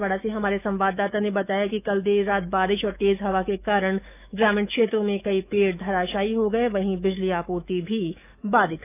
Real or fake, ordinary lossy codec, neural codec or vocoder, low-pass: fake; none; autoencoder, 48 kHz, 128 numbers a frame, DAC-VAE, trained on Japanese speech; 3.6 kHz